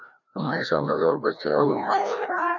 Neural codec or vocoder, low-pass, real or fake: codec, 16 kHz, 1 kbps, FreqCodec, larger model; 7.2 kHz; fake